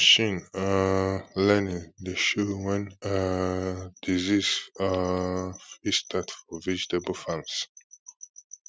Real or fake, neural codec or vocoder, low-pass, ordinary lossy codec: real; none; none; none